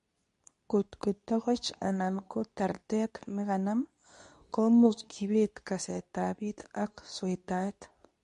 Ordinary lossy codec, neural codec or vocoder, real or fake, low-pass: MP3, 48 kbps; codec, 24 kHz, 0.9 kbps, WavTokenizer, medium speech release version 2; fake; 10.8 kHz